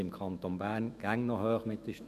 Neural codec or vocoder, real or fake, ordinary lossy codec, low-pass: autoencoder, 48 kHz, 128 numbers a frame, DAC-VAE, trained on Japanese speech; fake; none; 14.4 kHz